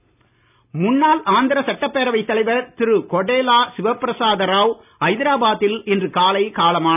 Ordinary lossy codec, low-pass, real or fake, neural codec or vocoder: none; 3.6 kHz; real; none